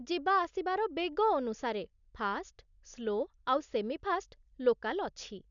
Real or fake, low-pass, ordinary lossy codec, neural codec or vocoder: real; 7.2 kHz; none; none